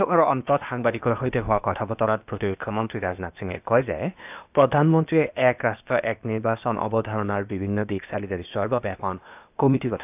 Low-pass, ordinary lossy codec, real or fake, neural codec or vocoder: 3.6 kHz; none; fake; codec, 16 kHz, 0.8 kbps, ZipCodec